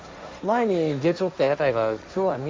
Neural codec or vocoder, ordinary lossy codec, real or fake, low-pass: codec, 16 kHz, 1.1 kbps, Voila-Tokenizer; none; fake; none